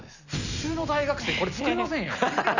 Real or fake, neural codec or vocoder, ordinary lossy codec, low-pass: real; none; none; 7.2 kHz